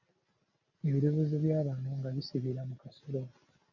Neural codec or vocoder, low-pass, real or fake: none; 7.2 kHz; real